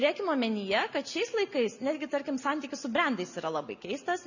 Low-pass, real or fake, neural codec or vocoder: 7.2 kHz; real; none